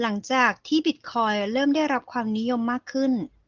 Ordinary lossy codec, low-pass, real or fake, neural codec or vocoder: Opus, 16 kbps; 7.2 kHz; real; none